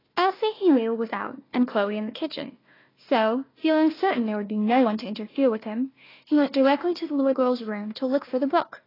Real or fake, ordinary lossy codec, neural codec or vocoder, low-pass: fake; AAC, 24 kbps; codec, 16 kHz, 1 kbps, FunCodec, trained on Chinese and English, 50 frames a second; 5.4 kHz